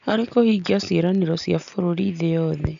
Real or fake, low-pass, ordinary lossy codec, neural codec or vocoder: real; 7.2 kHz; none; none